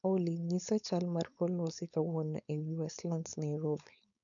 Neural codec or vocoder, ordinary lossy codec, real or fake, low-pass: codec, 16 kHz, 4.8 kbps, FACodec; none; fake; 7.2 kHz